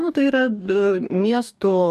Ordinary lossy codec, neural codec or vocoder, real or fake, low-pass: MP3, 96 kbps; codec, 44.1 kHz, 2.6 kbps, DAC; fake; 14.4 kHz